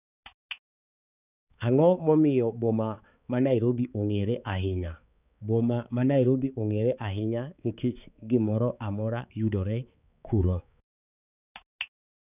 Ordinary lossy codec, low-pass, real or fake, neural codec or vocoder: none; 3.6 kHz; fake; codec, 16 kHz, 4 kbps, X-Codec, HuBERT features, trained on balanced general audio